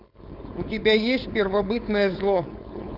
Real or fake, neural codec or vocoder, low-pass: fake; codec, 16 kHz, 4.8 kbps, FACodec; 5.4 kHz